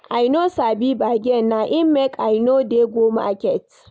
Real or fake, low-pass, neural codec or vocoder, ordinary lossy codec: real; none; none; none